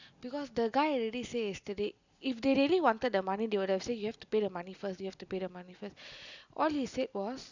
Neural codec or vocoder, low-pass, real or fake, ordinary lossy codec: none; 7.2 kHz; real; none